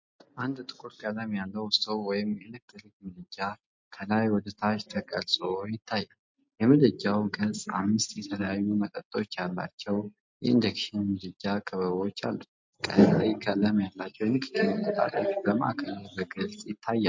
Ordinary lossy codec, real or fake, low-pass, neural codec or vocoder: MP3, 48 kbps; real; 7.2 kHz; none